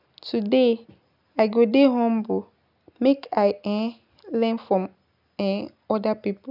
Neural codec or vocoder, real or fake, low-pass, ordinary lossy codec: none; real; 5.4 kHz; none